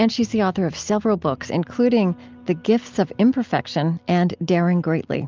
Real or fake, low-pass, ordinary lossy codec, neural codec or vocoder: real; 7.2 kHz; Opus, 24 kbps; none